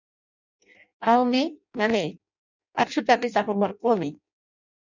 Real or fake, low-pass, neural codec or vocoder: fake; 7.2 kHz; codec, 16 kHz in and 24 kHz out, 0.6 kbps, FireRedTTS-2 codec